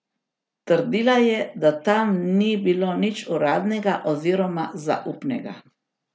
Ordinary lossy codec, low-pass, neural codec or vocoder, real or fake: none; none; none; real